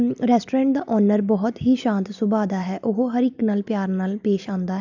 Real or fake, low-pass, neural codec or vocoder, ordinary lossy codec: real; 7.2 kHz; none; none